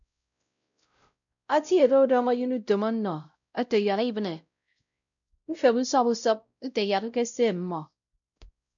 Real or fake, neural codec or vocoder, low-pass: fake; codec, 16 kHz, 0.5 kbps, X-Codec, WavLM features, trained on Multilingual LibriSpeech; 7.2 kHz